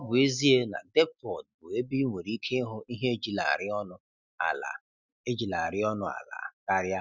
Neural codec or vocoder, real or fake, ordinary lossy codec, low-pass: none; real; none; 7.2 kHz